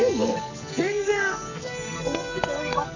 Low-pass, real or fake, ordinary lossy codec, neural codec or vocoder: 7.2 kHz; fake; none; codec, 44.1 kHz, 2.6 kbps, SNAC